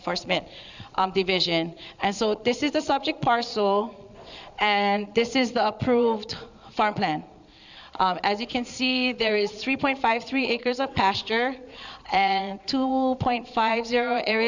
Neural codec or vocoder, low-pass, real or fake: vocoder, 22.05 kHz, 80 mel bands, Vocos; 7.2 kHz; fake